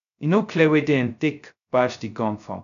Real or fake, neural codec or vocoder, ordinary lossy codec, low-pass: fake; codec, 16 kHz, 0.2 kbps, FocalCodec; none; 7.2 kHz